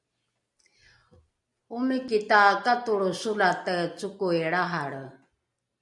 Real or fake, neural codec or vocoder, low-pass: real; none; 10.8 kHz